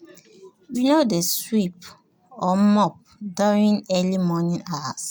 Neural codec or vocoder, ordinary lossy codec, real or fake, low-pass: none; none; real; none